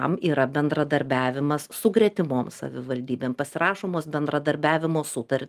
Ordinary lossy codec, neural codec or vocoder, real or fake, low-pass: Opus, 32 kbps; none; real; 14.4 kHz